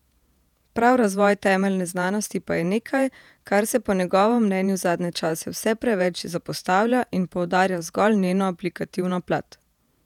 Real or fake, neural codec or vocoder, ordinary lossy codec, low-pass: fake; vocoder, 48 kHz, 128 mel bands, Vocos; none; 19.8 kHz